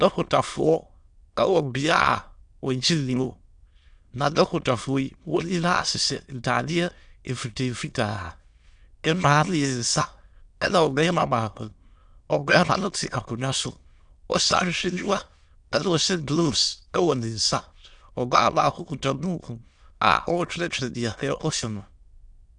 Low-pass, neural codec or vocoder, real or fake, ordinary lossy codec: 9.9 kHz; autoencoder, 22.05 kHz, a latent of 192 numbers a frame, VITS, trained on many speakers; fake; Opus, 64 kbps